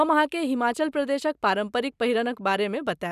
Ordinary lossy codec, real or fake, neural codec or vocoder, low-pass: none; real; none; 14.4 kHz